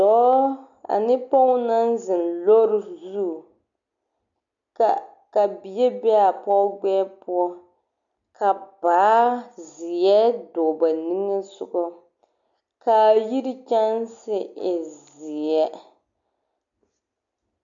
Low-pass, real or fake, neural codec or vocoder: 7.2 kHz; real; none